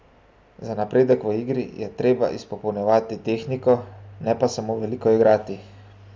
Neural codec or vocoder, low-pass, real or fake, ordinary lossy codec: none; none; real; none